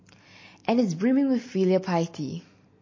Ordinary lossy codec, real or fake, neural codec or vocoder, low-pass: MP3, 32 kbps; real; none; 7.2 kHz